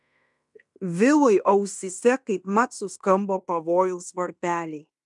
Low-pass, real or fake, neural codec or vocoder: 10.8 kHz; fake; codec, 16 kHz in and 24 kHz out, 0.9 kbps, LongCat-Audio-Codec, fine tuned four codebook decoder